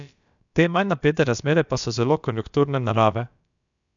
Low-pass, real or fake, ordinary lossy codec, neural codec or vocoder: 7.2 kHz; fake; none; codec, 16 kHz, about 1 kbps, DyCAST, with the encoder's durations